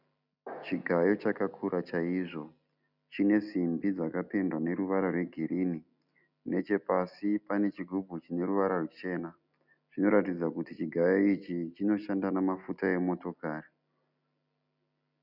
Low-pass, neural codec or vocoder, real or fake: 5.4 kHz; none; real